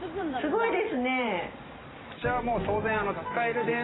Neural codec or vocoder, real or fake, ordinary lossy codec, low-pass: vocoder, 44.1 kHz, 128 mel bands every 512 samples, BigVGAN v2; fake; AAC, 16 kbps; 7.2 kHz